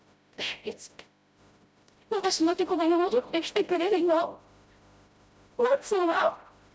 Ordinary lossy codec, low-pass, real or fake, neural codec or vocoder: none; none; fake; codec, 16 kHz, 0.5 kbps, FreqCodec, smaller model